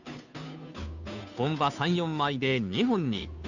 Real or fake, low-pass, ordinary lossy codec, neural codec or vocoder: fake; 7.2 kHz; none; codec, 16 kHz, 2 kbps, FunCodec, trained on Chinese and English, 25 frames a second